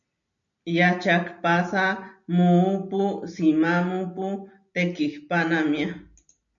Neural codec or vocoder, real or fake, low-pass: none; real; 7.2 kHz